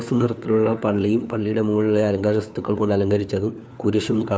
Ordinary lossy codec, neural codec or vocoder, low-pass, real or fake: none; codec, 16 kHz, 4 kbps, FunCodec, trained on LibriTTS, 50 frames a second; none; fake